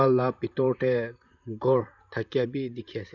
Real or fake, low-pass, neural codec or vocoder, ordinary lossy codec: fake; 7.2 kHz; vocoder, 44.1 kHz, 128 mel bands, Pupu-Vocoder; none